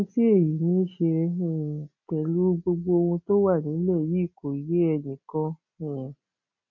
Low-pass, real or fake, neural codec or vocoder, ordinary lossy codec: 7.2 kHz; real; none; none